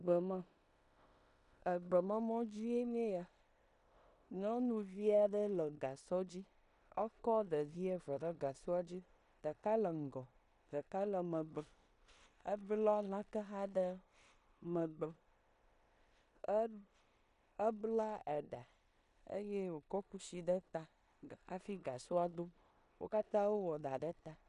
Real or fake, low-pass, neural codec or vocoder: fake; 10.8 kHz; codec, 16 kHz in and 24 kHz out, 0.9 kbps, LongCat-Audio-Codec, four codebook decoder